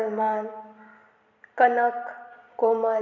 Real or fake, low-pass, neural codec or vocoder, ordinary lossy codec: fake; 7.2 kHz; vocoder, 44.1 kHz, 128 mel bands every 256 samples, BigVGAN v2; none